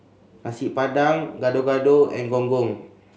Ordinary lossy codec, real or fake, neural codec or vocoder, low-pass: none; real; none; none